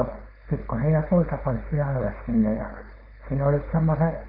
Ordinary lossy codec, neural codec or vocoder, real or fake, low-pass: none; codec, 16 kHz, 4.8 kbps, FACodec; fake; 5.4 kHz